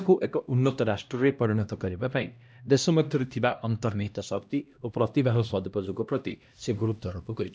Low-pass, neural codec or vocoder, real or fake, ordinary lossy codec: none; codec, 16 kHz, 1 kbps, X-Codec, HuBERT features, trained on LibriSpeech; fake; none